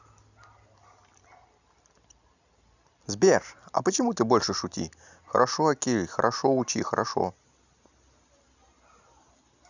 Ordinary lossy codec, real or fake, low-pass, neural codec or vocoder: none; real; 7.2 kHz; none